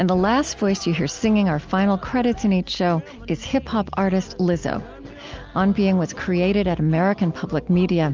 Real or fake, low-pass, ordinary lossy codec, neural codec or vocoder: real; 7.2 kHz; Opus, 24 kbps; none